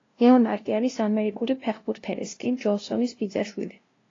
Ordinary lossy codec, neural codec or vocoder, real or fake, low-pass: AAC, 32 kbps; codec, 16 kHz, 0.5 kbps, FunCodec, trained on LibriTTS, 25 frames a second; fake; 7.2 kHz